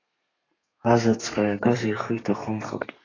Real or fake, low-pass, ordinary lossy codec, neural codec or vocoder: fake; 7.2 kHz; AAC, 32 kbps; codec, 32 kHz, 1.9 kbps, SNAC